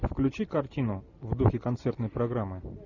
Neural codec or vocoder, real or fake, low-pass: none; real; 7.2 kHz